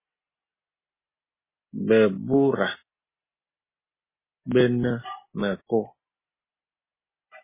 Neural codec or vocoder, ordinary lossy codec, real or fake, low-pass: none; MP3, 16 kbps; real; 3.6 kHz